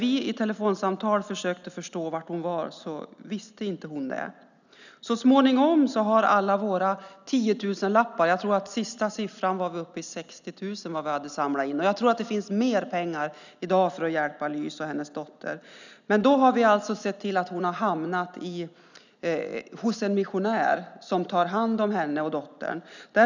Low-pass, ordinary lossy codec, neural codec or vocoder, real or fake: 7.2 kHz; none; none; real